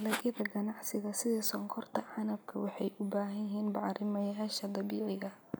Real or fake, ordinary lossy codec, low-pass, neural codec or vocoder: real; none; none; none